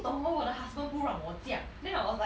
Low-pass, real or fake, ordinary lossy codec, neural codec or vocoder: none; real; none; none